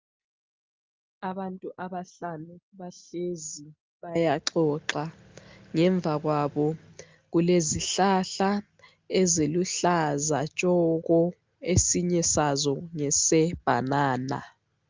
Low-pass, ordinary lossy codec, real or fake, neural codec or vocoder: 7.2 kHz; Opus, 24 kbps; real; none